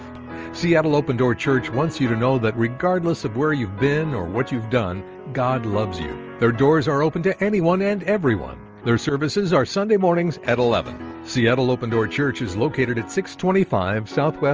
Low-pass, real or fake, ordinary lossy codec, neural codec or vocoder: 7.2 kHz; real; Opus, 24 kbps; none